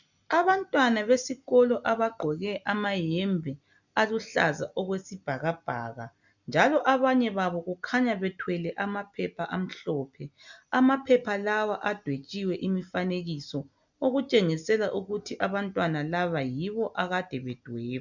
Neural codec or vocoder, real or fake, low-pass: none; real; 7.2 kHz